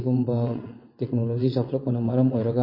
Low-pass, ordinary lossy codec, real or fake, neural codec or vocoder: 5.4 kHz; MP3, 24 kbps; fake; vocoder, 22.05 kHz, 80 mel bands, WaveNeXt